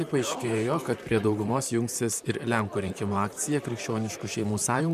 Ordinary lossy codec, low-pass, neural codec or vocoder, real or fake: MP3, 96 kbps; 14.4 kHz; vocoder, 44.1 kHz, 128 mel bands, Pupu-Vocoder; fake